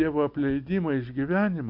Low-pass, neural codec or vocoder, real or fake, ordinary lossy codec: 5.4 kHz; none; real; MP3, 48 kbps